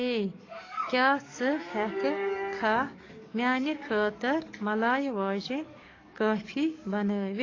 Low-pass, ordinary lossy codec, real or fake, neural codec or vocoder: 7.2 kHz; AAC, 32 kbps; fake; codec, 44.1 kHz, 7.8 kbps, DAC